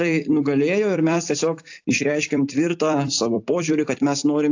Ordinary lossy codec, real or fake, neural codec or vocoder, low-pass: AAC, 48 kbps; fake; vocoder, 44.1 kHz, 128 mel bands every 256 samples, BigVGAN v2; 7.2 kHz